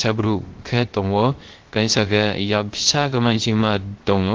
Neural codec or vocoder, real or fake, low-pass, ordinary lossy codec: codec, 16 kHz, 0.3 kbps, FocalCodec; fake; 7.2 kHz; Opus, 16 kbps